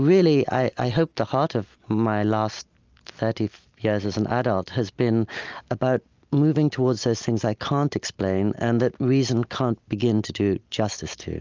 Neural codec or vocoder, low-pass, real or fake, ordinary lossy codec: none; 7.2 kHz; real; Opus, 24 kbps